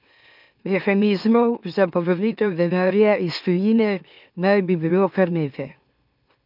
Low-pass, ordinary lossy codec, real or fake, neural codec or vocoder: 5.4 kHz; none; fake; autoencoder, 44.1 kHz, a latent of 192 numbers a frame, MeloTTS